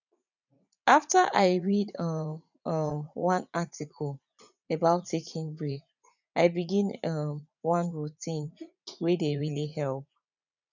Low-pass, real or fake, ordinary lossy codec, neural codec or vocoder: 7.2 kHz; fake; none; vocoder, 44.1 kHz, 80 mel bands, Vocos